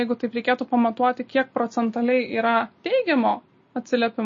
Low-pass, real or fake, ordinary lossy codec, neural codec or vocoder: 7.2 kHz; real; MP3, 32 kbps; none